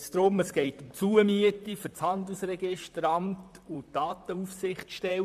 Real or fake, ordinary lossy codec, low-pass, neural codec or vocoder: fake; MP3, 96 kbps; 14.4 kHz; vocoder, 44.1 kHz, 128 mel bands, Pupu-Vocoder